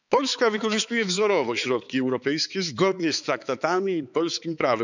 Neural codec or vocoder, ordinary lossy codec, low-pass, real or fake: codec, 16 kHz, 4 kbps, X-Codec, HuBERT features, trained on balanced general audio; none; 7.2 kHz; fake